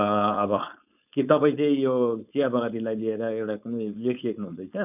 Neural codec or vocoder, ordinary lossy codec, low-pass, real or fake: codec, 16 kHz, 4.8 kbps, FACodec; none; 3.6 kHz; fake